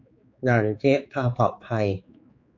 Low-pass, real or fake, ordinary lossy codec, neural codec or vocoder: 7.2 kHz; fake; MP3, 48 kbps; codec, 16 kHz, 4 kbps, X-Codec, HuBERT features, trained on general audio